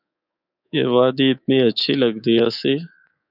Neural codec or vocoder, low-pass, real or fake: codec, 24 kHz, 3.1 kbps, DualCodec; 5.4 kHz; fake